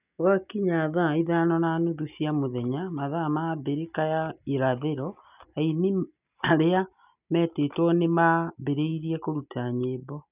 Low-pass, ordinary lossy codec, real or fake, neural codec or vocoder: 3.6 kHz; none; real; none